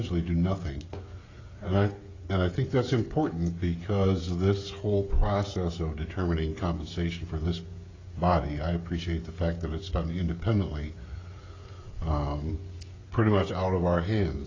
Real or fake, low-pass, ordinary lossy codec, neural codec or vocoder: fake; 7.2 kHz; AAC, 32 kbps; codec, 16 kHz, 16 kbps, FreqCodec, smaller model